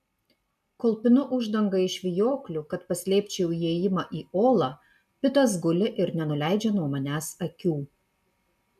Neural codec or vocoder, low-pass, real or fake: none; 14.4 kHz; real